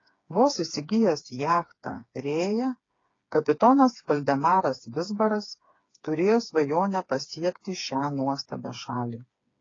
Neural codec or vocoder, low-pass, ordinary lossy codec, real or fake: codec, 16 kHz, 4 kbps, FreqCodec, smaller model; 7.2 kHz; AAC, 32 kbps; fake